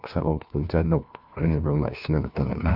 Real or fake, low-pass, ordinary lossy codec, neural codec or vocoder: fake; 5.4 kHz; none; codec, 16 kHz, 1 kbps, FunCodec, trained on LibriTTS, 50 frames a second